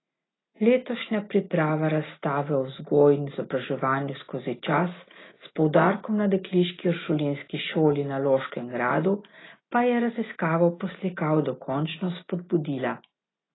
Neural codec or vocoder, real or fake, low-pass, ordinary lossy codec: none; real; 7.2 kHz; AAC, 16 kbps